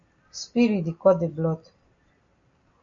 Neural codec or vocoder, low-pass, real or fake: none; 7.2 kHz; real